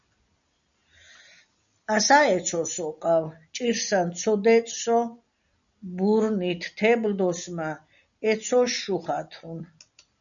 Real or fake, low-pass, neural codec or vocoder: real; 7.2 kHz; none